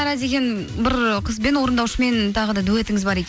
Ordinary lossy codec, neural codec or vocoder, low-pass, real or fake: none; none; none; real